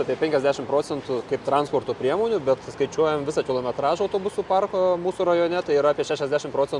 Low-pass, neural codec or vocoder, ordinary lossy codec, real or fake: 10.8 kHz; none; Opus, 64 kbps; real